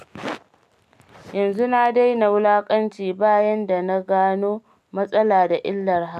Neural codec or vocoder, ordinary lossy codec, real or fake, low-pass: none; none; real; 14.4 kHz